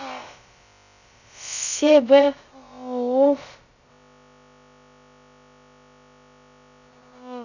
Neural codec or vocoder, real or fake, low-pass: codec, 16 kHz, about 1 kbps, DyCAST, with the encoder's durations; fake; 7.2 kHz